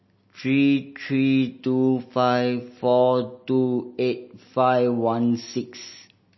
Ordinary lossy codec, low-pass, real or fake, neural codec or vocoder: MP3, 24 kbps; 7.2 kHz; real; none